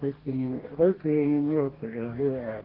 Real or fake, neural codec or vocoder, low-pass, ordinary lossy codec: fake; codec, 44.1 kHz, 2.6 kbps, DAC; 5.4 kHz; Opus, 16 kbps